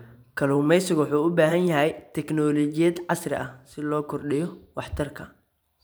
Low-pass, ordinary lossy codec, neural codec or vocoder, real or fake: none; none; vocoder, 44.1 kHz, 128 mel bands every 512 samples, BigVGAN v2; fake